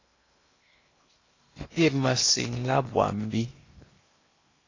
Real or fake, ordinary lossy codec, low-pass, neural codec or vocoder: fake; AAC, 32 kbps; 7.2 kHz; codec, 16 kHz in and 24 kHz out, 0.8 kbps, FocalCodec, streaming, 65536 codes